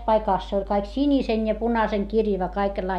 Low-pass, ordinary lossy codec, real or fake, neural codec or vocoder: 14.4 kHz; none; real; none